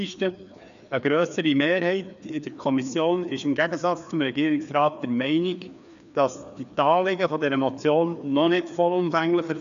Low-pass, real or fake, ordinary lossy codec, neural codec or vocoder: 7.2 kHz; fake; MP3, 96 kbps; codec, 16 kHz, 2 kbps, FreqCodec, larger model